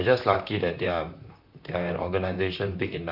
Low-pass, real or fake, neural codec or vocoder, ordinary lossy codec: 5.4 kHz; fake; vocoder, 44.1 kHz, 128 mel bands, Pupu-Vocoder; none